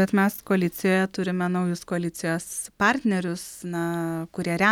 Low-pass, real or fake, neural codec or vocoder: 19.8 kHz; real; none